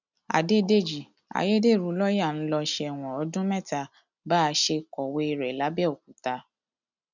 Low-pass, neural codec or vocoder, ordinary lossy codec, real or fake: 7.2 kHz; none; none; real